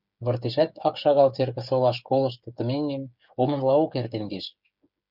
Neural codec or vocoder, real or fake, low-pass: codec, 16 kHz, 8 kbps, FreqCodec, smaller model; fake; 5.4 kHz